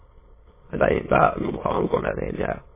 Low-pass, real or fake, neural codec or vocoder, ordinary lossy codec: 3.6 kHz; fake; autoencoder, 22.05 kHz, a latent of 192 numbers a frame, VITS, trained on many speakers; MP3, 16 kbps